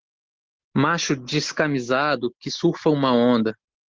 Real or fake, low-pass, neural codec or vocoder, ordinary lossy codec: real; 7.2 kHz; none; Opus, 16 kbps